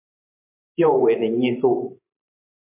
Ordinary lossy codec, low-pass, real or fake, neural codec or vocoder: MP3, 32 kbps; 3.6 kHz; fake; vocoder, 44.1 kHz, 128 mel bands every 512 samples, BigVGAN v2